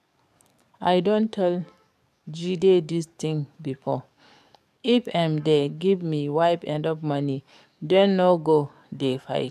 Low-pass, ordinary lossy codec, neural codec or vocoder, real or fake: 14.4 kHz; none; codec, 44.1 kHz, 7.8 kbps, DAC; fake